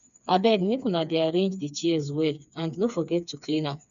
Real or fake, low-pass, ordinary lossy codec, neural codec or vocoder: fake; 7.2 kHz; none; codec, 16 kHz, 4 kbps, FreqCodec, smaller model